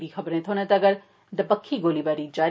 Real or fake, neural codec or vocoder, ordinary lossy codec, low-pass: real; none; none; none